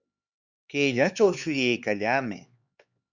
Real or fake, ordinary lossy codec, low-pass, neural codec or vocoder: fake; Opus, 64 kbps; 7.2 kHz; codec, 16 kHz, 4 kbps, X-Codec, HuBERT features, trained on LibriSpeech